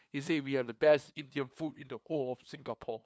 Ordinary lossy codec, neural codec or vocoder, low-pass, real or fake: none; codec, 16 kHz, 2 kbps, FunCodec, trained on LibriTTS, 25 frames a second; none; fake